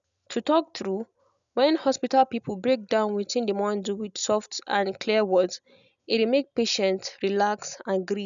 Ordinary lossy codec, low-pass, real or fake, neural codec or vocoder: none; 7.2 kHz; real; none